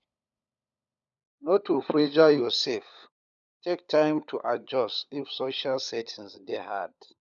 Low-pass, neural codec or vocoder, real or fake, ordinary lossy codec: 7.2 kHz; codec, 16 kHz, 16 kbps, FunCodec, trained on LibriTTS, 50 frames a second; fake; none